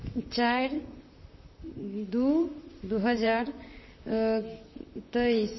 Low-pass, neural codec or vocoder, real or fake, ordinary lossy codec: 7.2 kHz; vocoder, 44.1 kHz, 128 mel bands, Pupu-Vocoder; fake; MP3, 24 kbps